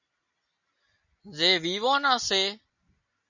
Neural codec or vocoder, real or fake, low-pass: none; real; 7.2 kHz